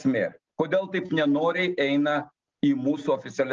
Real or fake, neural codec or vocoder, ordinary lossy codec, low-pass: real; none; Opus, 24 kbps; 7.2 kHz